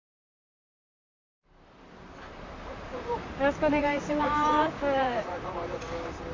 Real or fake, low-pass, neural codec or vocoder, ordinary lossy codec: fake; 7.2 kHz; vocoder, 44.1 kHz, 128 mel bands, Pupu-Vocoder; AAC, 32 kbps